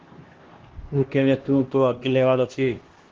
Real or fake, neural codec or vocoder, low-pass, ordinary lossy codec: fake; codec, 16 kHz, 1 kbps, X-Codec, HuBERT features, trained on LibriSpeech; 7.2 kHz; Opus, 16 kbps